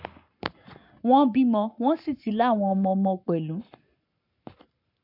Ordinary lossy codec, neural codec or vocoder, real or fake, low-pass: MP3, 48 kbps; codec, 44.1 kHz, 7.8 kbps, Pupu-Codec; fake; 5.4 kHz